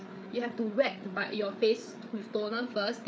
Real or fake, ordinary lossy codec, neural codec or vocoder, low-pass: fake; none; codec, 16 kHz, 8 kbps, FreqCodec, larger model; none